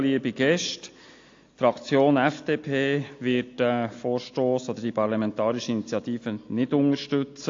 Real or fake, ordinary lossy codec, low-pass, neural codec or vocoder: real; AAC, 48 kbps; 7.2 kHz; none